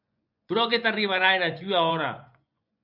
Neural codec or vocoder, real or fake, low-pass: vocoder, 24 kHz, 100 mel bands, Vocos; fake; 5.4 kHz